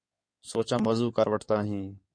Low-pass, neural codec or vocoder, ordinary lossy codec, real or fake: 9.9 kHz; codec, 16 kHz in and 24 kHz out, 2.2 kbps, FireRedTTS-2 codec; MP3, 48 kbps; fake